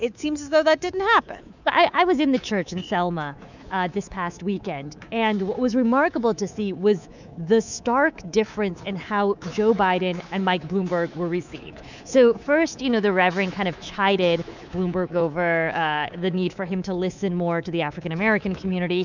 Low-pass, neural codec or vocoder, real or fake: 7.2 kHz; codec, 24 kHz, 3.1 kbps, DualCodec; fake